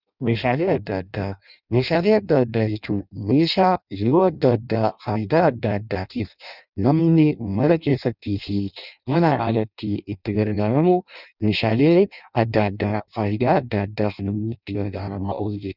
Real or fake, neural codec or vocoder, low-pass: fake; codec, 16 kHz in and 24 kHz out, 0.6 kbps, FireRedTTS-2 codec; 5.4 kHz